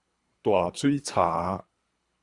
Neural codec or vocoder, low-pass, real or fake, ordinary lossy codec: codec, 24 kHz, 3 kbps, HILCodec; 10.8 kHz; fake; Opus, 64 kbps